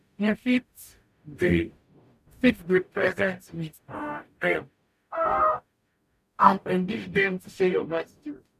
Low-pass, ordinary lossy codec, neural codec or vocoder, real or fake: 14.4 kHz; none; codec, 44.1 kHz, 0.9 kbps, DAC; fake